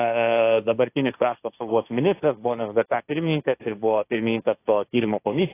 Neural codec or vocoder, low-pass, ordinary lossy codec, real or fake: codec, 16 kHz, 1.1 kbps, Voila-Tokenizer; 3.6 kHz; AAC, 24 kbps; fake